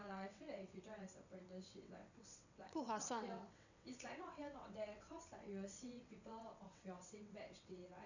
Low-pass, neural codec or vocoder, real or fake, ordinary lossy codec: 7.2 kHz; vocoder, 22.05 kHz, 80 mel bands, WaveNeXt; fake; none